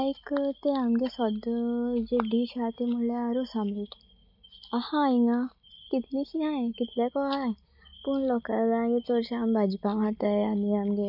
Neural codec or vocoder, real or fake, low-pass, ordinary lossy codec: none; real; 5.4 kHz; none